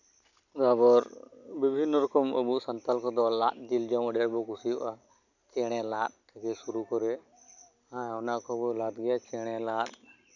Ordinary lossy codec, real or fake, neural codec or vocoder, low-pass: none; real; none; 7.2 kHz